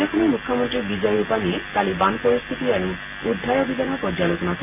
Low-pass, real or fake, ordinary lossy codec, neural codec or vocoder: 3.6 kHz; real; MP3, 24 kbps; none